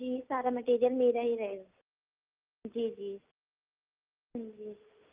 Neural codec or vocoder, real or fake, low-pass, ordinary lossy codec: none; real; 3.6 kHz; Opus, 24 kbps